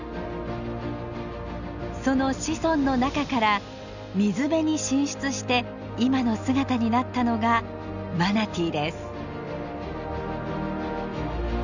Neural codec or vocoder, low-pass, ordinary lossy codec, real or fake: none; 7.2 kHz; none; real